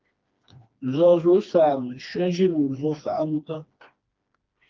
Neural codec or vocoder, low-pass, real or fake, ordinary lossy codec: codec, 16 kHz, 2 kbps, FreqCodec, smaller model; 7.2 kHz; fake; Opus, 32 kbps